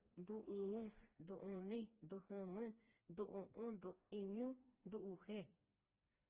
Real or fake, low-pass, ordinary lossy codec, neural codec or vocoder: fake; 3.6 kHz; Opus, 16 kbps; codec, 16 kHz, 2 kbps, FreqCodec, smaller model